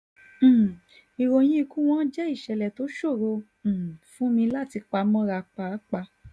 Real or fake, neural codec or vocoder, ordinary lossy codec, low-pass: real; none; none; none